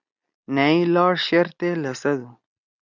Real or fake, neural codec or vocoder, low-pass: real; none; 7.2 kHz